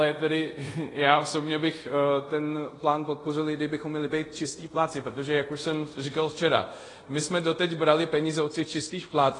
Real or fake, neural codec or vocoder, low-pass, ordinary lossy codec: fake; codec, 24 kHz, 0.5 kbps, DualCodec; 10.8 kHz; AAC, 32 kbps